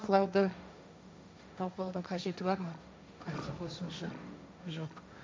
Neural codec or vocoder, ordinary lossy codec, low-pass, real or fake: codec, 16 kHz, 1.1 kbps, Voila-Tokenizer; none; none; fake